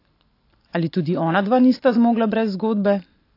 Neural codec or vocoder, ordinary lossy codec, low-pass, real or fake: none; AAC, 32 kbps; 5.4 kHz; real